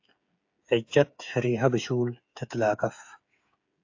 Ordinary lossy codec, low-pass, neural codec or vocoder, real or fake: AAC, 48 kbps; 7.2 kHz; codec, 16 kHz, 8 kbps, FreqCodec, smaller model; fake